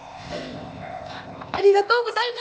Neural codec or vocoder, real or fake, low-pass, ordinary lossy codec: codec, 16 kHz, 0.8 kbps, ZipCodec; fake; none; none